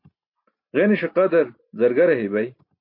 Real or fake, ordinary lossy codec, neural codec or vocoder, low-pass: real; MP3, 32 kbps; none; 5.4 kHz